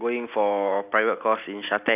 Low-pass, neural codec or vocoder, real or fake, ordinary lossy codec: 3.6 kHz; none; real; none